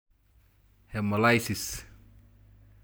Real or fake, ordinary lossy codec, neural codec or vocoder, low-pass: real; none; none; none